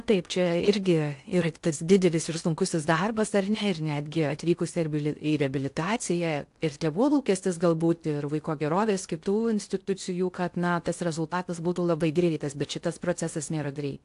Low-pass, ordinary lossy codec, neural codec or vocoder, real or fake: 10.8 kHz; AAC, 64 kbps; codec, 16 kHz in and 24 kHz out, 0.6 kbps, FocalCodec, streaming, 2048 codes; fake